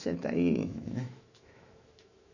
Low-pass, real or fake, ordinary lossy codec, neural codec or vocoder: 7.2 kHz; fake; none; autoencoder, 48 kHz, 128 numbers a frame, DAC-VAE, trained on Japanese speech